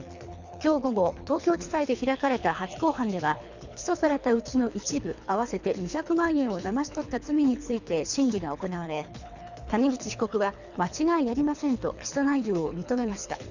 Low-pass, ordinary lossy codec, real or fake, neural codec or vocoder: 7.2 kHz; none; fake; codec, 24 kHz, 3 kbps, HILCodec